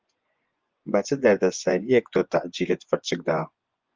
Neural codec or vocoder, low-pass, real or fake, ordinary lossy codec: vocoder, 24 kHz, 100 mel bands, Vocos; 7.2 kHz; fake; Opus, 32 kbps